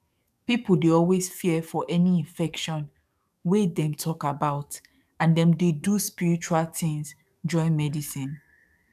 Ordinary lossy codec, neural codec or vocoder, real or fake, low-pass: none; codec, 44.1 kHz, 7.8 kbps, DAC; fake; 14.4 kHz